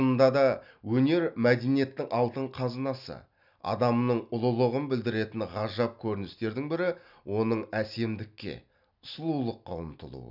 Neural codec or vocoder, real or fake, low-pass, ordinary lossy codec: none; real; 5.4 kHz; none